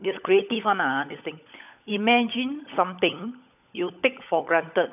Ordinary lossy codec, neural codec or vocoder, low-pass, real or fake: none; codec, 16 kHz, 16 kbps, FunCodec, trained on LibriTTS, 50 frames a second; 3.6 kHz; fake